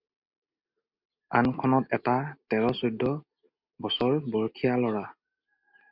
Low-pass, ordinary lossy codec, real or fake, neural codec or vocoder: 5.4 kHz; MP3, 48 kbps; real; none